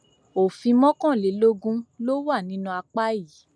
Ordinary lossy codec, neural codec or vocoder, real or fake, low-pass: none; none; real; none